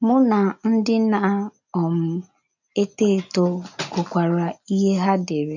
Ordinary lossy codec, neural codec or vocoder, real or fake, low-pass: none; none; real; 7.2 kHz